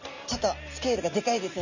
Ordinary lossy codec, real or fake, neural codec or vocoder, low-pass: none; real; none; 7.2 kHz